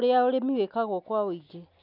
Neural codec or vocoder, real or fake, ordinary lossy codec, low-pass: none; real; none; 5.4 kHz